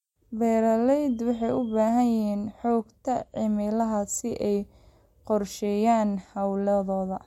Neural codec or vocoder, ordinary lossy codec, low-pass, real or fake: none; MP3, 64 kbps; 19.8 kHz; real